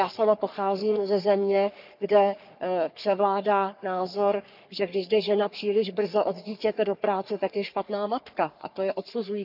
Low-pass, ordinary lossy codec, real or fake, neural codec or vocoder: 5.4 kHz; none; fake; codec, 44.1 kHz, 3.4 kbps, Pupu-Codec